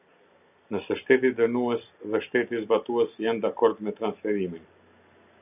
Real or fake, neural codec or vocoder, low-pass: real; none; 3.6 kHz